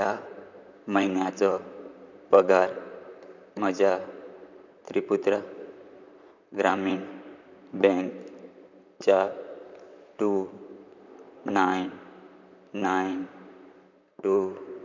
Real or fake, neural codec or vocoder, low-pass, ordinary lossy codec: fake; vocoder, 44.1 kHz, 128 mel bands, Pupu-Vocoder; 7.2 kHz; none